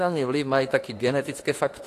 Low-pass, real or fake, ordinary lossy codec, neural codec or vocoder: 14.4 kHz; fake; AAC, 48 kbps; autoencoder, 48 kHz, 32 numbers a frame, DAC-VAE, trained on Japanese speech